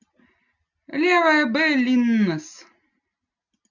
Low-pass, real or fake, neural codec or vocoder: 7.2 kHz; real; none